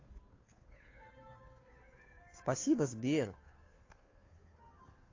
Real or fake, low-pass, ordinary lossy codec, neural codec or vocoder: fake; 7.2 kHz; AAC, 32 kbps; codec, 16 kHz, 2 kbps, FunCodec, trained on Chinese and English, 25 frames a second